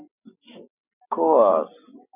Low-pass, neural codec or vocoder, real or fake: 3.6 kHz; none; real